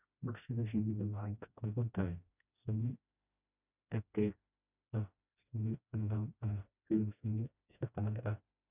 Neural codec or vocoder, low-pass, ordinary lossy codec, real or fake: codec, 16 kHz, 1 kbps, FreqCodec, smaller model; 3.6 kHz; none; fake